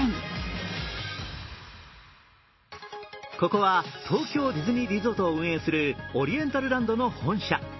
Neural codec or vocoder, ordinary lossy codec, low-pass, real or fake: none; MP3, 24 kbps; 7.2 kHz; real